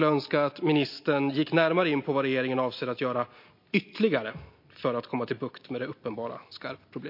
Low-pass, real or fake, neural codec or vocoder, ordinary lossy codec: 5.4 kHz; real; none; MP3, 32 kbps